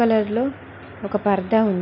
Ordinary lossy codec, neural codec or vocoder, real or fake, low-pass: none; none; real; 5.4 kHz